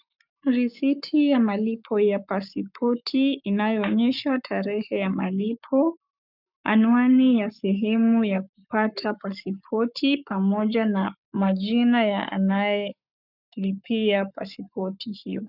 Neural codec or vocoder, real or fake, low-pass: codec, 44.1 kHz, 7.8 kbps, Pupu-Codec; fake; 5.4 kHz